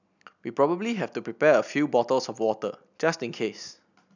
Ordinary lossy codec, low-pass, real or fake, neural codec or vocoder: none; 7.2 kHz; real; none